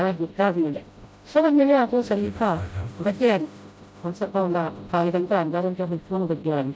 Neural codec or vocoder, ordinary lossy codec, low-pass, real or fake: codec, 16 kHz, 0.5 kbps, FreqCodec, smaller model; none; none; fake